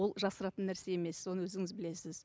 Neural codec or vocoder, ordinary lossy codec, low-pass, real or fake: none; none; none; real